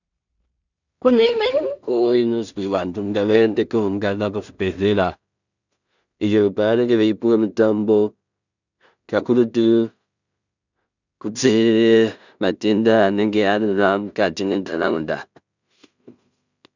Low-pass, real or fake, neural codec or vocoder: 7.2 kHz; fake; codec, 16 kHz in and 24 kHz out, 0.4 kbps, LongCat-Audio-Codec, two codebook decoder